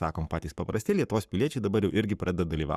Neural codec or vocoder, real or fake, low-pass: codec, 44.1 kHz, 7.8 kbps, Pupu-Codec; fake; 14.4 kHz